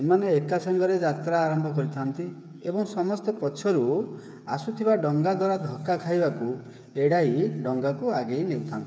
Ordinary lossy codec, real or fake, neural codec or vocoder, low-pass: none; fake; codec, 16 kHz, 8 kbps, FreqCodec, smaller model; none